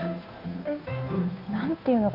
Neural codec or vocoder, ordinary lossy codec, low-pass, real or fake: codec, 16 kHz, 2 kbps, FunCodec, trained on Chinese and English, 25 frames a second; none; 5.4 kHz; fake